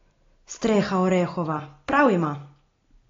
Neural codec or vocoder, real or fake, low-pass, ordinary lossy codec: none; real; 7.2 kHz; AAC, 32 kbps